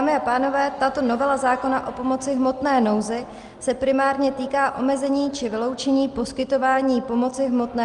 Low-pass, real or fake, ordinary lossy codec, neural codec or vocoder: 10.8 kHz; real; Opus, 32 kbps; none